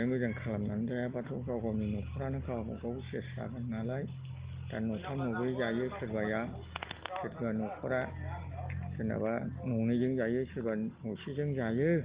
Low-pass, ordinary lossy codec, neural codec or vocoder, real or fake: 3.6 kHz; Opus, 16 kbps; none; real